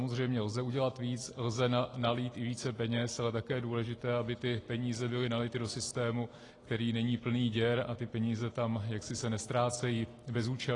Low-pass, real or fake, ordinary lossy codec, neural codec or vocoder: 10.8 kHz; real; AAC, 32 kbps; none